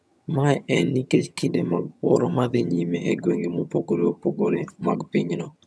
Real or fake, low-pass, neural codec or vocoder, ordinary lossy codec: fake; none; vocoder, 22.05 kHz, 80 mel bands, HiFi-GAN; none